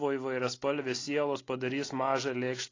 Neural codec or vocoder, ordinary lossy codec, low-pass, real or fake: none; AAC, 32 kbps; 7.2 kHz; real